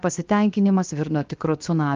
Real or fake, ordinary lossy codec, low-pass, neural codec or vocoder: fake; Opus, 32 kbps; 7.2 kHz; codec, 16 kHz, 0.7 kbps, FocalCodec